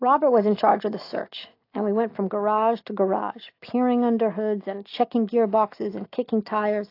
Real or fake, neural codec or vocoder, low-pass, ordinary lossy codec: real; none; 5.4 kHz; AAC, 32 kbps